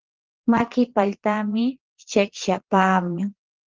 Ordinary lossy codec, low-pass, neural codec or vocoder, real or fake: Opus, 16 kbps; 7.2 kHz; vocoder, 22.05 kHz, 80 mel bands, Vocos; fake